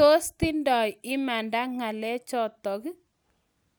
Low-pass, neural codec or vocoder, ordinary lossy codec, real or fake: none; none; none; real